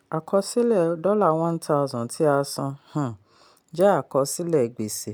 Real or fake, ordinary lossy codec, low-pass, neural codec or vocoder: real; none; none; none